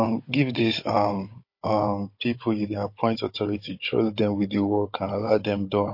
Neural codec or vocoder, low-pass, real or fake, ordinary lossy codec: codec, 16 kHz, 16 kbps, FunCodec, trained on Chinese and English, 50 frames a second; 5.4 kHz; fake; MP3, 32 kbps